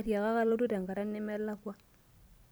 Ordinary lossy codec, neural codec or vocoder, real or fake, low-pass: none; vocoder, 44.1 kHz, 128 mel bands every 256 samples, BigVGAN v2; fake; none